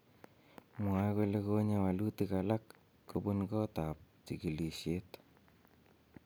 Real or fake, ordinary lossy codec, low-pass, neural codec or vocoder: real; none; none; none